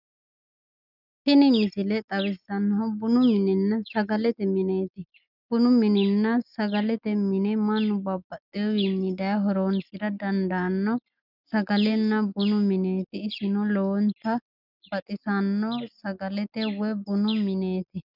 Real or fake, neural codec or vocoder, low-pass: real; none; 5.4 kHz